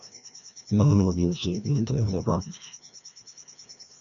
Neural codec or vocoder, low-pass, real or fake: codec, 16 kHz, 1 kbps, FreqCodec, larger model; 7.2 kHz; fake